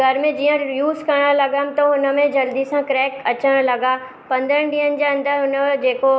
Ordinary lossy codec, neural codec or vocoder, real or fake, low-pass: none; none; real; none